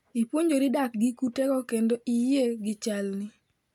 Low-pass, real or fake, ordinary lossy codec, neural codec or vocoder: 19.8 kHz; real; none; none